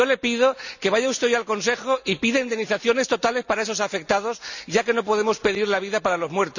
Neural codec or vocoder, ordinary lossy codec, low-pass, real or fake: none; none; 7.2 kHz; real